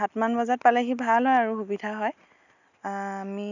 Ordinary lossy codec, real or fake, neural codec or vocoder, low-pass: none; real; none; 7.2 kHz